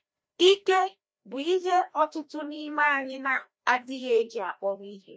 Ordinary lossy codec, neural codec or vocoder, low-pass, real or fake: none; codec, 16 kHz, 1 kbps, FreqCodec, larger model; none; fake